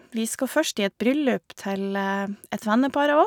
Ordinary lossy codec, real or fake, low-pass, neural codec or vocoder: none; real; none; none